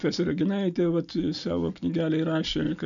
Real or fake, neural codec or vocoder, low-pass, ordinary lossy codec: real; none; 7.2 kHz; MP3, 96 kbps